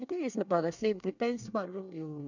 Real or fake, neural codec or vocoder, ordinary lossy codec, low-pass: fake; codec, 24 kHz, 1 kbps, SNAC; none; 7.2 kHz